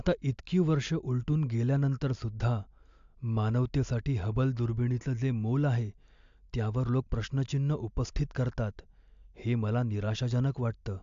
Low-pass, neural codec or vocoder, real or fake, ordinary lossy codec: 7.2 kHz; none; real; AAC, 64 kbps